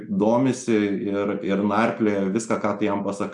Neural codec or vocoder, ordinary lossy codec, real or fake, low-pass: none; MP3, 96 kbps; real; 10.8 kHz